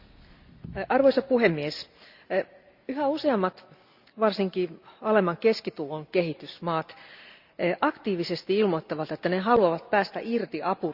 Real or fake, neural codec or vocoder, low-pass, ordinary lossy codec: real; none; 5.4 kHz; Opus, 64 kbps